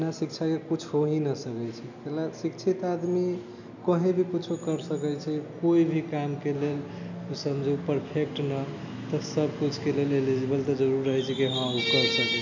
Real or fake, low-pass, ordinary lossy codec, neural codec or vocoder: real; 7.2 kHz; none; none